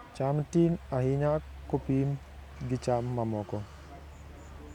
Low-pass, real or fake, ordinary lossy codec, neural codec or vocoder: 19.8 kHz; real; none; none